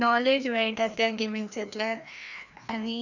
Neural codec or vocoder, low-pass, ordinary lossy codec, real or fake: codec, 16 kHz, 1 kbps, FreqCodec, larger model; 7.2 kHz; none; fake